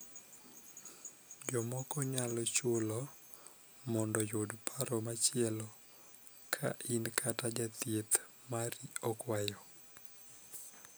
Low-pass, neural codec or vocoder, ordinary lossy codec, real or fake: none; none; none; real